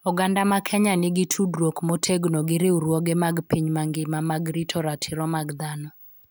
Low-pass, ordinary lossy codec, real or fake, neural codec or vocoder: none; none; real; none